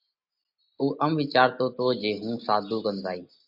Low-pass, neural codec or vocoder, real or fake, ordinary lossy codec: 5.4 kHz; none; real; MP3, 48 kbps